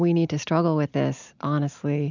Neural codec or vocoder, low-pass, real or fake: none; 7.2 kHz; real